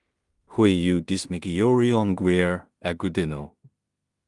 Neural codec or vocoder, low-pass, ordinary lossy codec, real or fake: codec, 16 kHz in and 24 kHz out, 0.4 kbps, LongCat-Audio-Codec, two codebook decoder; 10.8 kHz; Opus, 32 kbps; fake